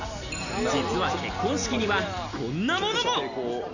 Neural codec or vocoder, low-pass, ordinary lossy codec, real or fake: none; 7.2 kHz; none; real